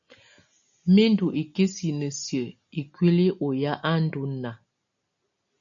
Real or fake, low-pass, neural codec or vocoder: real; 7.2 kHz; none